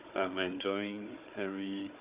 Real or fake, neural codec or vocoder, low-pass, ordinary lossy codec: fake; codec, 16 kHz, 16 kbps, FunCodec, trained on Chinese and English, 50 frames a second; 3.6 kHz; Opus, 64 kbps